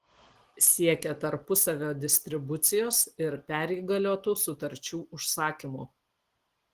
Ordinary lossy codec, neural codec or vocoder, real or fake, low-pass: Opus, 16 kbps; none; real; 14.4 kHz